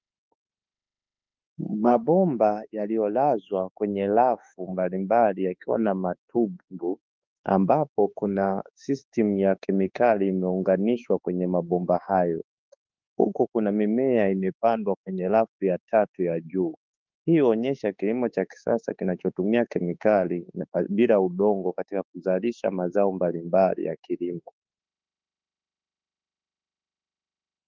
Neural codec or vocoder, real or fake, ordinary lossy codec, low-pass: autoencoder, 48 kHz, 32 numbers a frame, DAC-VAE, trained on Japanese speech; fake; Opus, 24 kbps; 7.2 kHz